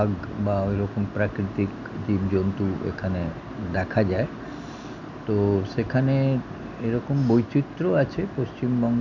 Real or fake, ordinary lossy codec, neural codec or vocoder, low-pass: real; none; none; 7.2 kHz